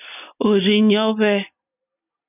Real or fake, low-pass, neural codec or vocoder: real; 3.6 kHz; none